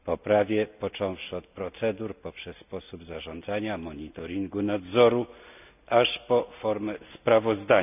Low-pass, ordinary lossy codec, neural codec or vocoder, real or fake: 3.6 kHz; none; none; real